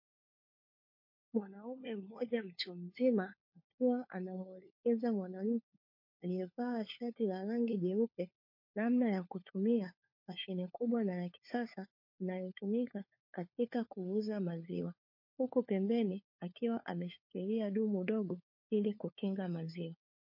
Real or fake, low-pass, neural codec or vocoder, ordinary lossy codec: fake; 5.4 kHz; codec, 16 kHz, 4 kbps, FunCodec, trained on LibriTTS, 50 frames a second; MP3, 32 kbps